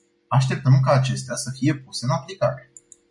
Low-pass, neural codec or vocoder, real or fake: 10.8 kHz; none; real